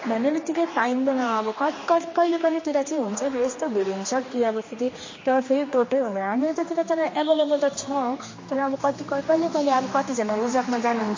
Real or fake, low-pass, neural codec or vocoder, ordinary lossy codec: fake; 7.2 kHz; codec, 16 kHz, 2 kbps, X-Codec, HuBERT features, trained on general audio; MP3, 32 kbps